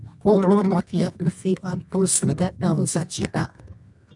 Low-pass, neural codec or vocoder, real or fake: 10.8 kHz; codec, 24 kHz, 0.9 kbps, WavTokenizer, medium music audio release; fake